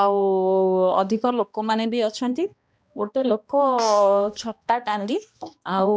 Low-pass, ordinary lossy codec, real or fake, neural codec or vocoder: none; none; fake; codec, 16 kHz, 1 kbps, X-Codec, HuBERT features, trained on balanced general audio